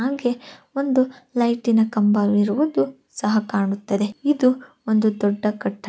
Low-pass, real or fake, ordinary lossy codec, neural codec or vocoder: none; real; none; none